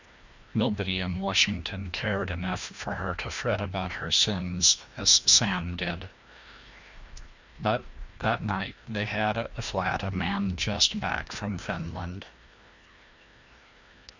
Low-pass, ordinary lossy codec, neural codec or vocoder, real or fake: 7.2 kHz; Opus, 64 kbps; codec, 16 kHz, 1 kbps, FreqCodec, larger model; fake